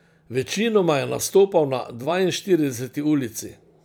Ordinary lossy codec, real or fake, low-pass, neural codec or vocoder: none; fake; none; vocoder, 44.1 kHz, 128 mel bands every 256 samples, BigVGAN v2